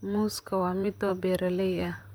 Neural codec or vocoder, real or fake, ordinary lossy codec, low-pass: vocoder, 44.1 kHz, 128 mel bands, Pupu-Vocoder; fake; none; none